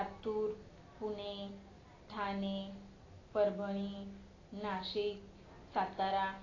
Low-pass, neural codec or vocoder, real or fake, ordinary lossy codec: 7.2 kHz; none; real; AAC, 32 kbps